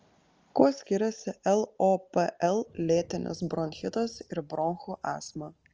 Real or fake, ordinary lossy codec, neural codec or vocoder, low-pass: real; Opus, 24 kbps; none; 7.2 kHz